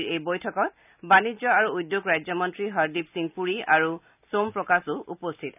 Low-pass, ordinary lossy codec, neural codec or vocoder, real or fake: 3.6 kHz; none; none; real